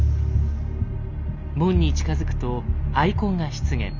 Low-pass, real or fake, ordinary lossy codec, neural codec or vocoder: 7.2 kHz; real; none; none